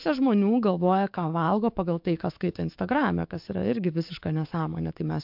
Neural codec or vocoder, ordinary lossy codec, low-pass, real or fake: codec, 44.1 kHz, 7.8 kbps, DAC; MP3, 48 kbps; 5.4 kHz; fake